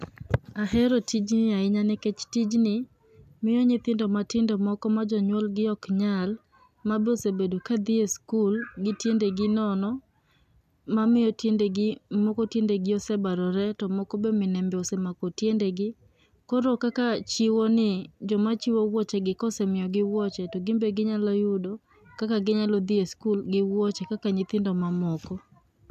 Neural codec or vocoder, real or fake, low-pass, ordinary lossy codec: none; real; 14.4 kHz; none